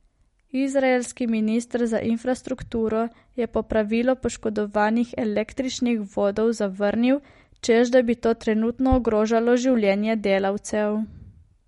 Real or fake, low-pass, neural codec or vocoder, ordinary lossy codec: real; 14.4 kHz; none; MP3, 48 kbps